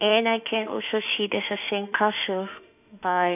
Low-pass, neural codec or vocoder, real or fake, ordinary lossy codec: 3.6 kHz; autoencoder, 48 kHz, 32 numbers a frame, DAC-VAE, trained on Japanese speech; fake; none